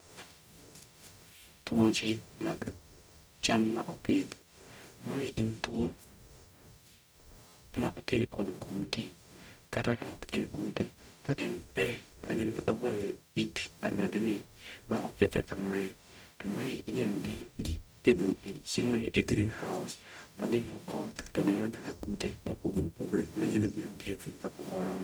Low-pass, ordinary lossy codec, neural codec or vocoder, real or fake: none; none; codec, 44.1 kHz, 0.9 kbps, DAC; fake